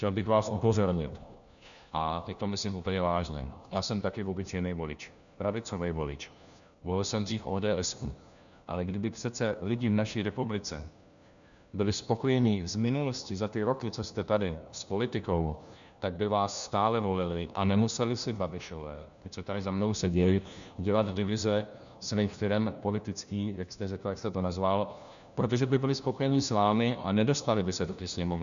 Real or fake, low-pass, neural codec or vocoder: fake; 7.2 kHz; codec, 16 kHz, 1 kbps, FunCodec, trained on LibriTTS, 50 frames a second